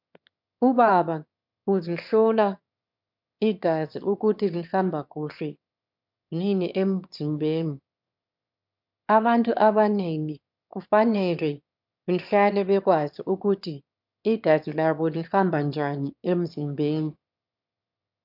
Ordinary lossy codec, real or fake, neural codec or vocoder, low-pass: MP3, 48 kbps; fake; autoencoder, 22.05 kHz, a latent of 192 numbers a frame, VITS, trained on one speaker; 5.4 kHz